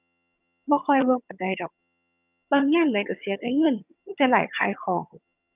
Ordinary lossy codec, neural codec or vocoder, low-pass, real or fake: none; vocoder, 22.05 kHz, 80 mel bands, HiFi-GAN; 3.6 kHz; fake